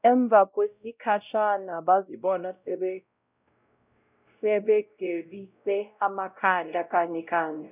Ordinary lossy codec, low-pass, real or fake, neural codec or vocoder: none; 3.6 kHz; fake; codec, 16 kHz, 0.5 kbps, X-Codec, WavLM features, trained on Multilingual LibriSpeech